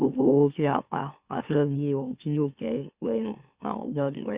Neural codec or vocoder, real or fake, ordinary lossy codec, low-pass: autoencoder, 44.1 kHz, a latent of 192 numbers a frame, MeloTTS; fake; Opus, 64 kbps; 3.6 kHz